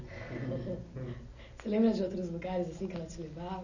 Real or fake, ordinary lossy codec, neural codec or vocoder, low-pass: real; none; none; 7.2 kHz